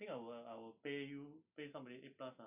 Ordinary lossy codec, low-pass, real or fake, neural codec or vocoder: none; 3.6 kHz; real; none